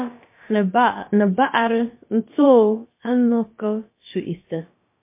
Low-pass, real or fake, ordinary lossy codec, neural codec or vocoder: 3.6 kHz; fake; MP3, 24 kbps; codec, 16 kHz, about 1 kbps, DyCAST, with the encoder's durations